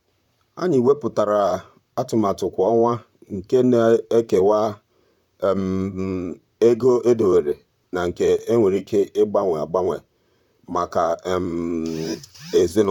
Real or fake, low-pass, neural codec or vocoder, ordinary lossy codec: fake; 19.8 kHz; vocoder, 44.1 kHz, 128 mel bands, Pupu-Vocoder; none